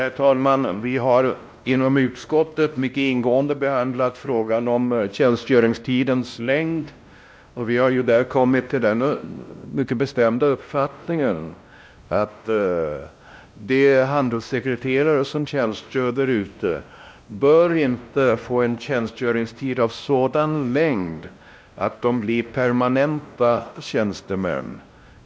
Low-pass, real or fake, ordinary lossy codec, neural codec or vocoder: none; fake; none; codec, 16 kHz, 1 kbps, X-Codec, WavLM features, trained on Multilingual LibriSpeech